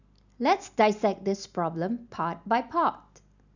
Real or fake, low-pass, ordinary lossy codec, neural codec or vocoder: real; 7.2 kHz; none; none